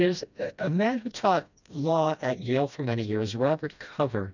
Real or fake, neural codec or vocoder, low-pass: fake; codec, 16 kHz, 1 kbps, FreqCodec, smaller model; 7.2 kHz